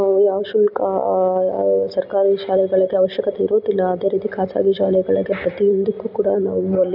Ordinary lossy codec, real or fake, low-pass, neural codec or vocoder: none; real; 5.4 kHz; none